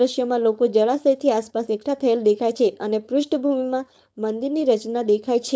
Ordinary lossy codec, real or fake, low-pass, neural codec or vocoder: none; fake; none; codec, 16 kHz, 4.8 kbps, FACodec